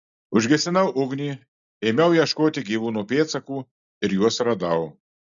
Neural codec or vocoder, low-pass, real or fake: none; 7.2 kHz; real